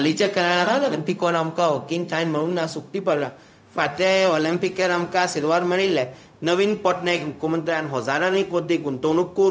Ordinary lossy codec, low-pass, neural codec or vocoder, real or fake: none; none; codec, 16 kHz, 0.4 kbps, LongCat-Audio-Codec; fake